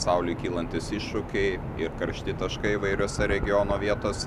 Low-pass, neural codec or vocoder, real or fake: 14.4 kHz; none; real